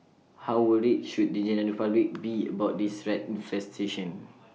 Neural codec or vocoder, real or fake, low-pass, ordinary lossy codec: none; real; none; none